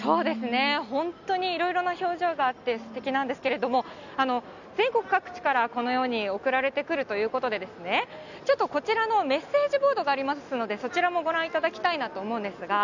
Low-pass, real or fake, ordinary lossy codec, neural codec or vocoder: 7.2 kHz; real; none; none